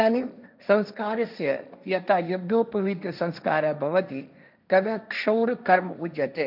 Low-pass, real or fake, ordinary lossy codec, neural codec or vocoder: 5.4 kHz; fake; none; codec, 16 kHz, 1.1 kbps, Voila-Tokenizer